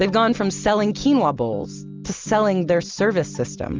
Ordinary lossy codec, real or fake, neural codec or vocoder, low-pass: Opus, 32 kbps; real; none; 7.2 kHz